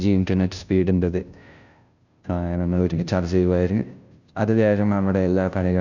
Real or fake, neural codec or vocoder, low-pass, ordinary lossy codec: fake; codec, 16 kHz, 0.5 kbps, FunCodec, trained on Chinese and English, 25 frames a second; 7.2 kHz; none